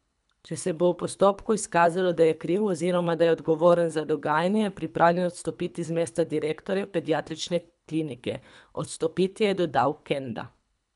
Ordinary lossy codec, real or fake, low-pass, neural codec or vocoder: none; fake; 10.8 kHz; codec, 24 kHz, 3 kbps, HILCodec